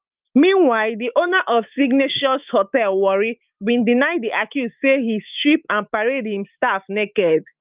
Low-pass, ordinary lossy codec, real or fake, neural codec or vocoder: 3.6 kHz; Opus, 24 kbps; fake; autoencoder, 48 kHz, 128 numbers a frame, DAC-VAE, trained on Japanese speech